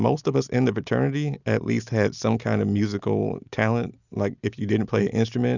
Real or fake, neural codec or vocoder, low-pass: real; none; 7.2 kHz